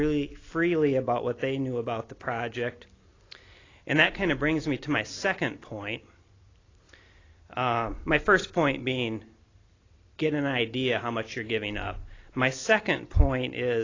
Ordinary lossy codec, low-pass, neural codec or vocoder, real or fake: AAC, 32 kbps; 7.2 kHz; none; real